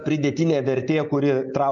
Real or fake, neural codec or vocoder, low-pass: real; none; 7.2 kHz